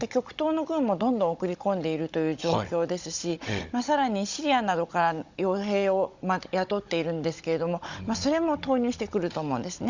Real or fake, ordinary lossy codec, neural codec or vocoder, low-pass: fake; Opus, 64 kbps; codec, 16 kHz, 16 kbps, FunCodec, trained on Chinese and English, 50 frames a second; 7.2 kHz